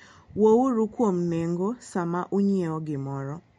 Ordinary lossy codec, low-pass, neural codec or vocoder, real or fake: MP3, 48 kbps; 19.8 kHz; none; real